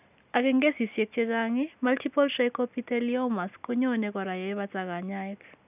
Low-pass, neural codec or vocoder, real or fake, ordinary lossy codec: 3.6 kHz; none; real; none